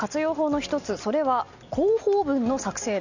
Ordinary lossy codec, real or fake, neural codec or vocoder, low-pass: none; real; none; 7.2 kHz